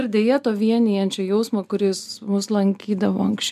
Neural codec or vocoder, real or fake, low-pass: none; real; 14.4 kHz